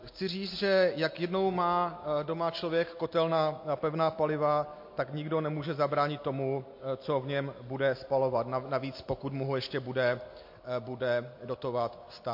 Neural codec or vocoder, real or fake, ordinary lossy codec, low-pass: none; real; MP3, 32 kbps; 5.4 kHz